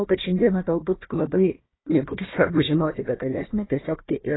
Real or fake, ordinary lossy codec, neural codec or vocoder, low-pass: fake; AAC, 16 kbps; codec, 16 kHz, 1 kbps, FunCodec, trained on Chinese and English, 50 frames a second; 7.2 kHz